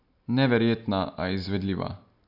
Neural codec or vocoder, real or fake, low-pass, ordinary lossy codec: none; real; 5.4 kHz; none